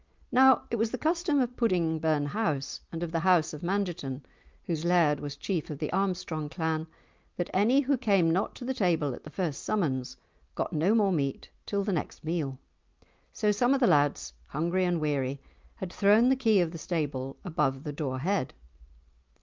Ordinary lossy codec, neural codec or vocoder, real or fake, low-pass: Opus, 24 kbps; none; real; 7.2 kHz